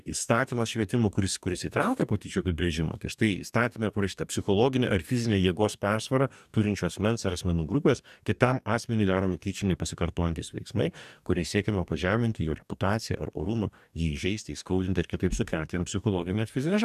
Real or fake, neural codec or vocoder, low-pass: fake; codec, 44.1 kHz, 2.6 kbps, DAC; 14.4 kHz